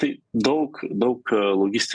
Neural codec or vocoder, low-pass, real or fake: none; 9.9 kHz; real